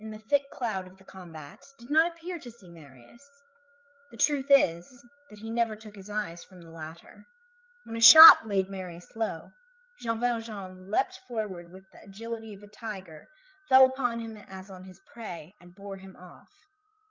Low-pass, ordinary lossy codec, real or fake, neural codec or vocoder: 7.2 kHz; Opus, 32 kbps; fake; codec, 16 kHz, 8 kbps, FreqCodec, larger model